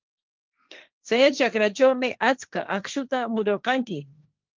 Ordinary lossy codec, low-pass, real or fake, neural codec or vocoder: Opus, 24 kbps; 7.2 kHz; fake; codec, 16 kHz, 1.1 kbps, Voila-Tokenizer